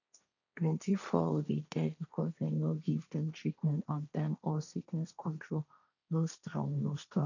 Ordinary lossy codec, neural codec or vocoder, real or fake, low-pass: none; codec, 16 kHz, 1.1 kbps, Voila-Tokenizer; fake; 7.2 kHz